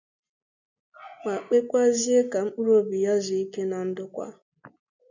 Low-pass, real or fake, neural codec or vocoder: 7.2 kHz; real; none